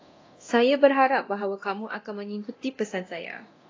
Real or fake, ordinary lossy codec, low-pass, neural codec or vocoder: fake; AAC, 32 kbps; 7.2 kHz; codec, 24 kHz, 0.9 kbps, DualCodec